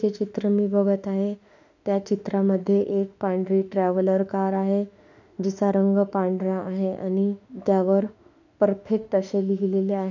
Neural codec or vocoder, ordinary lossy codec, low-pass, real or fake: autoencoder, 48 kHz, 32 numbers a frame, DAC-VAE, trained on Japanese speech; none; 7.2 kHz; fake